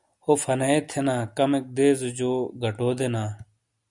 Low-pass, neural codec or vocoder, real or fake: 10.8 kHz; none; real